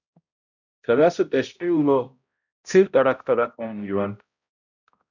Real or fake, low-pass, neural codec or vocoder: fake; 7.2 kHz; codec, 16 kHz, 0.5 kbps, X-Codec, HuBERT features, trained on balanced general audio